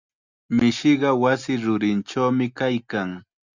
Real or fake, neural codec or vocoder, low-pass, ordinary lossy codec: real; none; 7.2 kHz; Opus, 64 kbps